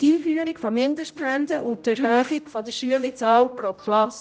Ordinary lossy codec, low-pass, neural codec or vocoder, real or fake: none; none; codec, 16 kHz, 0.5 kbps, X-Codec, HuBERT features, trained on general audio; fake